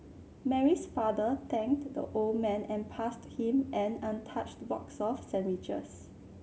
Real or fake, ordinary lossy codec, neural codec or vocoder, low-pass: real; none; none; none